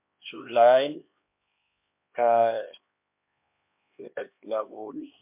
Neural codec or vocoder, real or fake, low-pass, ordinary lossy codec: codec, 16 kHz, 2 kbps, X-Codec, HuBERT features, trained on LibriSpeech; fake; 3.6 kHz; MP3, 32 kbps